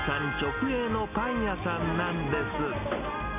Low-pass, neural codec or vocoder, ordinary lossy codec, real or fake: 3.6 kHz; none; none; real